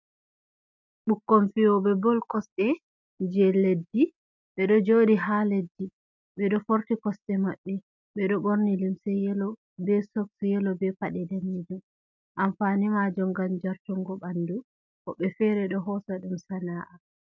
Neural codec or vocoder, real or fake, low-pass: none; real; 7.2 kHz